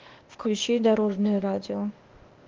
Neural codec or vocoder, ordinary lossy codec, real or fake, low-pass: codec, 16 kHz, 0.8 kbps, ZipCodec; Opus, 16 kbps; fake; 7.2 kHz